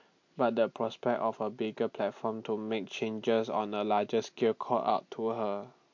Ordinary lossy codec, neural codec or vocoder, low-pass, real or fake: MP3, 48 kbps; none; 7.2 kHz; real